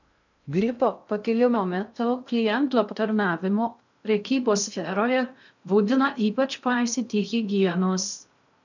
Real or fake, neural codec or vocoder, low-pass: fake; codec, 16 kHz in and 24 kHz out, 0.6 kbps, FocalCodec, streaming, 2048 codes; 7.2 kHz